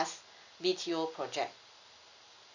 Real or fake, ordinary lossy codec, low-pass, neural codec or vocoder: real; none; 7.2 kHz; none